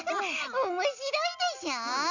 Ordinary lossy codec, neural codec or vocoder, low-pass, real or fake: none; none; 7.2 kHz; real